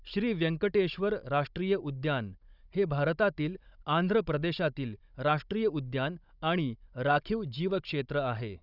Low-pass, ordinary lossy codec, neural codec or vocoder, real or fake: 5.4 kHz; none; codec, 16 kHz, 16 kbps, FunCodec, trained on Chinese and English, 50 frames a second; fake